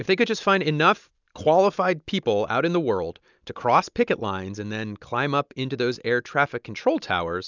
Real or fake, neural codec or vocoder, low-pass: real; none; 7.2 kHz